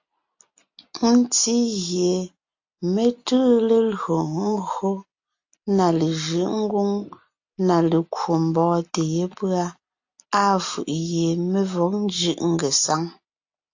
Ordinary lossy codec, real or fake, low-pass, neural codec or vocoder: AAC, 48 kbps; real; 7.2 kHz; none